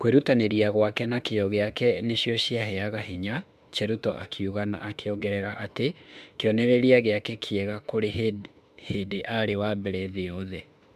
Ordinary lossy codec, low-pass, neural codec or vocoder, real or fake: AAC, 96 kbps; 14.4 kHz; autoencoder, 48 kHz, 32 numbers a frame, DAC-VAE, trained on Japanese speech; fake